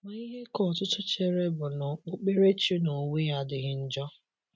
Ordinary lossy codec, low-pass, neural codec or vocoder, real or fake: none; none; none; real